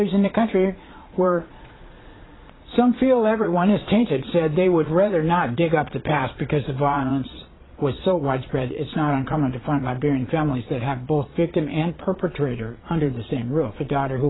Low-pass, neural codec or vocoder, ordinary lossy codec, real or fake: 7.2 kHz; vocoder, 22.05 kHz, 80 mel bands, Vocos; AAC, 16 kbps; fake